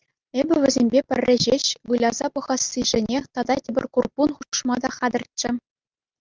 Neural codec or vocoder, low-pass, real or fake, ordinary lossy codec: none; 7.2 kHz; real; Opus, 32 kbps